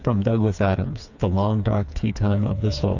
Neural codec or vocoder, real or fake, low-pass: codec, 16 kHz, 4 kbps, FreqCodec, smaller model; fake; 7.2 kHz